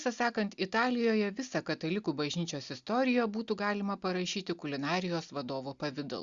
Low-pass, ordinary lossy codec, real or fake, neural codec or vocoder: 7.2 kHz; Opus, 64 kbps; real; none